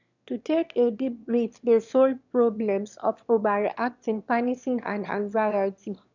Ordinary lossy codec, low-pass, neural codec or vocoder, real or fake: none; 7.2 kHz; autoencoder, 22.05 kHz, a latent of 192 numbers a frame, VITS, trained on one speaker; fake